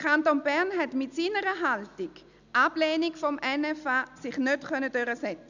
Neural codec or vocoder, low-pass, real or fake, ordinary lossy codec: none; 7.2 kHz; real; none